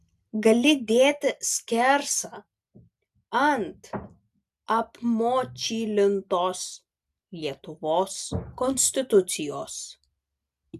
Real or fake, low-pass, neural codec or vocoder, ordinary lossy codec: real; 14.4 kHz; none; AAC, 96 kbps